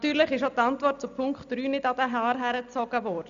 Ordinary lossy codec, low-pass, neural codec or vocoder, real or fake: none; 7.2 kHz; none; real